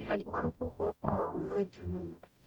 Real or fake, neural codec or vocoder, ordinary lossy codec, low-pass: fake; codec, 44.1 kHz, 0.9 kbps, DAC; none; 19.8 kHz